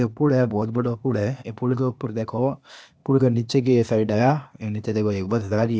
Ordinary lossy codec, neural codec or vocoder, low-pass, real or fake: none; codec, 16 kHz, 0.8 kbps, ZipCodec; none; fake